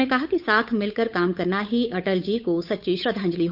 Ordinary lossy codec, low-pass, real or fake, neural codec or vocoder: none; 5.4 kHz; fake; codec, 16 kHz, 8 kbps, FunCodec, trained on Chinese and English, 25 frames a second